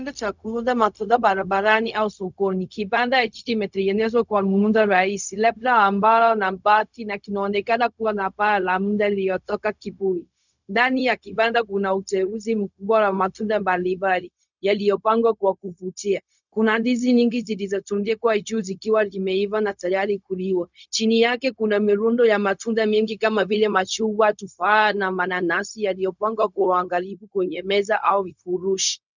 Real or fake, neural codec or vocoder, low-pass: fake; codec, 16 kHz, 0.4 kbps, LongCat-Audio-Codec; 7.2 kHz